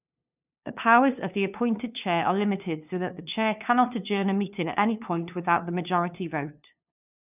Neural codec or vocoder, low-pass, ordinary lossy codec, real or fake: codec, 16 kHz, 2 kbps, FunCodec, trained on LibriTTS, 25 frames a second; 3.6 kHz; none; fake